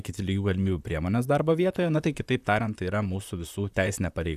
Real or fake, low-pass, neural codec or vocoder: fake; 14.4 kHz; vocoder, 44.1 kHz, 128 mel bands, Pupu-Vocoder